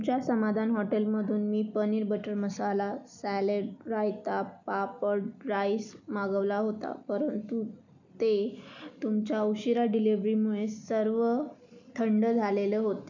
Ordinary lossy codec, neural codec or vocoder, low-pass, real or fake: none; none; 7.2 kHz; real